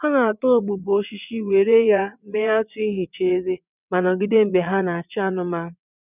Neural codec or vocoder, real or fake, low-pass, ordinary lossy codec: vocoder, 22.05 kHz, 80 mel bands, WaveNeXt; fake; 3.6 kHz; none